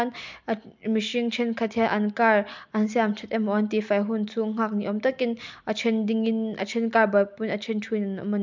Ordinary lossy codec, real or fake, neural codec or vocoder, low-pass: MP3, 64 kbps; real; none; 7.2 kHz